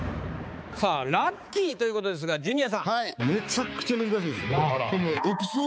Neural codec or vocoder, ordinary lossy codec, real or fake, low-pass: codec, 16 kHz, 4 kbps, X-Codec, HuBERT features, trained on balanced general audio; none; fake; none